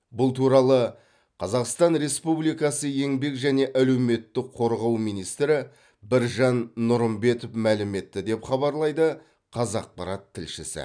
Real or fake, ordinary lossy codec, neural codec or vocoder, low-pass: real; none; none; 9.9 kHz